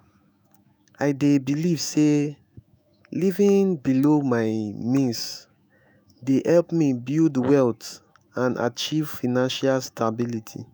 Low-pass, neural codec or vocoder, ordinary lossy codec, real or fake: none; autoencoder, 48 kHz, 128 numbers a frame, DAC-VAE, trained on Japanese speech; none; fake